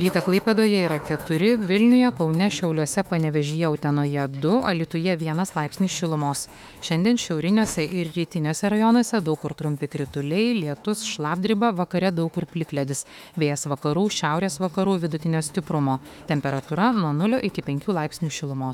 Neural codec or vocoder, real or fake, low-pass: autoencoder, 48 kHz, 32 numbers a frame, DAC-VAE, trained on Japanese speech; fake; 19.8 kHz